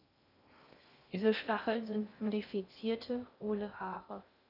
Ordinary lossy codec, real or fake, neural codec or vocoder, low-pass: none; fake; codec, 16 kHz in and 24 kHz out, 0.6 kbps, FocalCodec, streaming, 2048 codes; 5.4 kHz